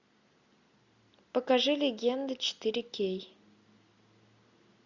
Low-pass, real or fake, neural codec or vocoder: 7.2 kHz; real; none